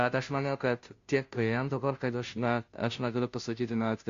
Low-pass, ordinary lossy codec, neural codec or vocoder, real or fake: 7.2 kHz; MP3, 48 kbps; codec, 16 kHz, 0.5 kbps, FunCodec, trained on Chinese and English, 25 frames a second; fake